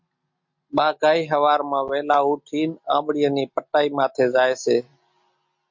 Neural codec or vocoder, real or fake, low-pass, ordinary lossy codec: none; real; 7.2 kHz; MP3, 48 kbps